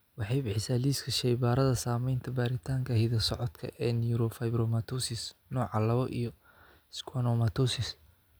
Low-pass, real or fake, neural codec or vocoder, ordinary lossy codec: none; real; none; none